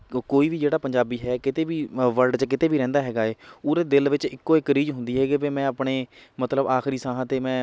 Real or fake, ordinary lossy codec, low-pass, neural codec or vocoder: real; none; none; none